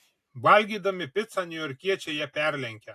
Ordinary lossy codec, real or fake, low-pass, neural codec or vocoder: AAC, 64 kbps; real; 14.4 kHz; none